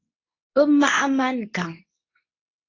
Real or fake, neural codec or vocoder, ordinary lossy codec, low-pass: fake; codec, 24 kHz, 0.9 kbps, WavTokenizer, medium speech release version 2; AAC, 32 kbps; 7.2 kHz